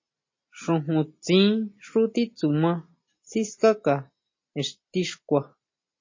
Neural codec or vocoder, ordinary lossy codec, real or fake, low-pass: none; MP3, 32 kbps; real; 7.2 kHz